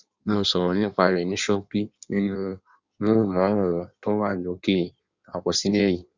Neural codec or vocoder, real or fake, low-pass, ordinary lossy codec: codec, 16 kHz in and 24 kHz out, 1.1 kbps, FireRedTTS-2 codec; fake; 7.2 kHz; Opus, 64 kbps